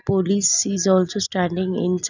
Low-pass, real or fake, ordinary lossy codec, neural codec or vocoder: 7.2 kHz; real; none; none